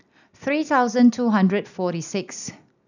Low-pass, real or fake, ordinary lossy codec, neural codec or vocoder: 7.2 kHz; real; none; none